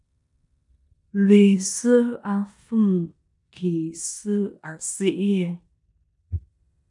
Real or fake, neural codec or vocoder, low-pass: fake; codec, 16 kHz in and 24 kHz out, 0.9 kbps, LongCat-Audio-Codec, four codebook decoder; 10.8 kHz